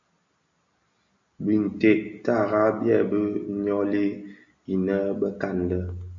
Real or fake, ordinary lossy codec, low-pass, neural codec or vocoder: real; AAC, 64 kbps; 7.2 kHz; none